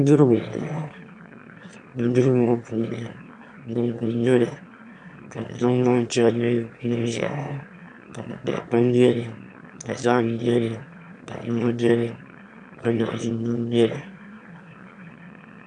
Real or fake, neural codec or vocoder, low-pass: fake; autoencoder, 22.05 kHz, a latent of 192 numbers a frame, VITS, trained on one speaker; 9.9 kHz